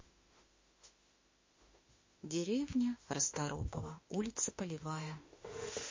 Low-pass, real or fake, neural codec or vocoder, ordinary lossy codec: 7.2 kHz; fake; autoencoder, 48 kHz, 32 numbers a frame, DAC-VAE, trained on Japanese speech; MP3, 32 kbps